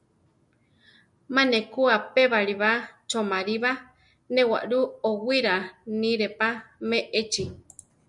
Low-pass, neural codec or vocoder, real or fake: 10.8 kHz; none; real